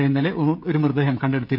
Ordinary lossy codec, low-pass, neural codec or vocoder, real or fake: none; 5.4 kHz; codec, 16 kHz, 16 kbps, FreqCodec, smaller model; fake